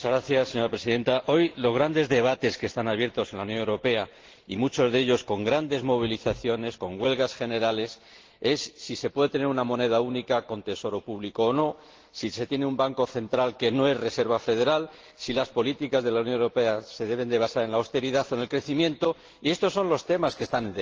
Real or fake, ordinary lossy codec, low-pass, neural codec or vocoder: real; Opus, 16 kbps; 7.2 kHz; none